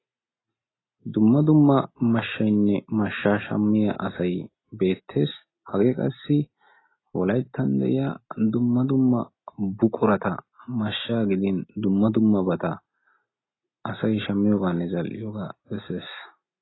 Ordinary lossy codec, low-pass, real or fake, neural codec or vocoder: AAC, 16 kbps; 7.2 kHz; real; none